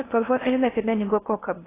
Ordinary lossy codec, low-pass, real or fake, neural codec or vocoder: AAC, 16 kbps; 3.6 kHz; fake; codec, 16 kHz in and 24 kHz out, 0.8 kbps, FocalCodec, streaming, 65536 codes